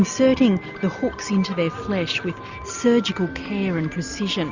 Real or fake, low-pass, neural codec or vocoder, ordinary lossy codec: real; 7.2 kHz; none; Opus, 64 kbps